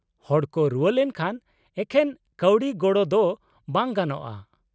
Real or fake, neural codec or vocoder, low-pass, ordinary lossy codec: real; none; none; none